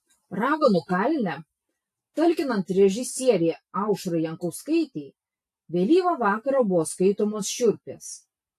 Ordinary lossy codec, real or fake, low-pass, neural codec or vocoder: AAC, 64 kbps; real; 14.4 kHz; none